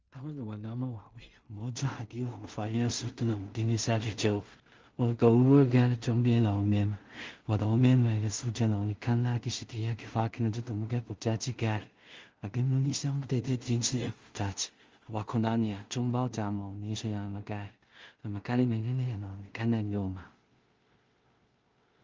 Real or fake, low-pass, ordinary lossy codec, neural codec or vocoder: fake; 7.2 kHz; Opus, 32 kbps; codec, 16 kHz in and 24 kHz out, 0.4 kbps, LongCat-Audio-Codec, two codebook decoder